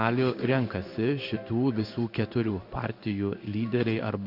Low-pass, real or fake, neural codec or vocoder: 5.4 kHz; fake; codec, 16 kHz in and 24 kHz out, 1 kbps, XY-Tokenizer